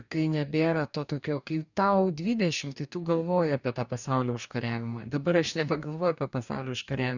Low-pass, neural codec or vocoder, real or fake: 7.2 kHz; codec, 44.1 kHz, 2.6 kbps, DAC; fake